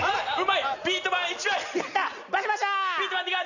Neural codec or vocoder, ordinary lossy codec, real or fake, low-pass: none; none; real; 7.2 kHz